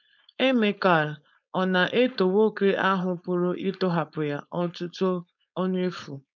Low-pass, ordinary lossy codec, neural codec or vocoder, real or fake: 7.2 kHz; none; codec, 16 kHz, 4.8 kbps, FACodec; fake